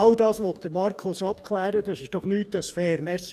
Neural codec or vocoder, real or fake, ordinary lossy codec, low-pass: codec, 44.1 kHz, 2.6 kbps, DAC; fake; none; 14.4 kHz